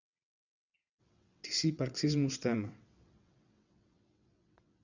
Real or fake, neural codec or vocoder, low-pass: fake; vocoder, 22.05 kHz, 80 mel bands, WaveNeXt; 7.2 kHz